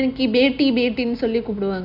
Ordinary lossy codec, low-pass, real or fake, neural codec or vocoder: none; 5.4 kHz; real; none